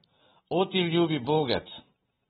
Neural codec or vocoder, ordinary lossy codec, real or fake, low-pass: vocoder, 44.1 kHz, 128 mel bands every 512 samples, BigVGAN v2; AAC, 16 kbps; fake; 19.8 kHz